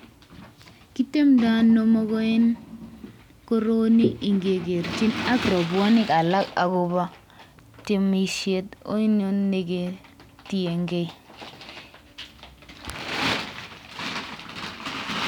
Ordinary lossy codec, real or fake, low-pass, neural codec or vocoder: none; real; 19.8 kHz; none